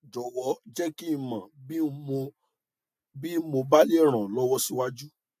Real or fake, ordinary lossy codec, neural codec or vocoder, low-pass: real; AAC, 96 kbps; none; 14.4 kHz